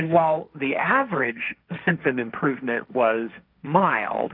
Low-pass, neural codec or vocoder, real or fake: 5.4 kHz; codec, 16 kHz, 1.1 kbps, Voila-Tokenizer; fake